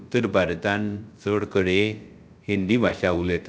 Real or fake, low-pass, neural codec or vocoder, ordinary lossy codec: fake; none; codec, 16 kHz, 0.3 kbps, FocalCodec; none